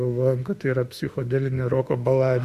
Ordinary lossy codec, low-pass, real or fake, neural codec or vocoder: Opus, 64 kbps; 14.4 kHz; fake; autoencoder, 48 kHz, 32 numbers a frame, DAC-VAE, trained on Japanese speech